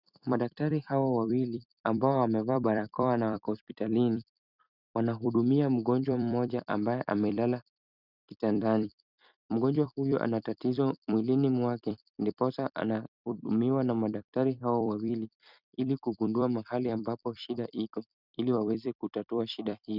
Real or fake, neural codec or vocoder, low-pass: fake; vocoder, 44.1 kHz, 128 mel bands every 256 samples, BigVGAN v2; 5.4 kHz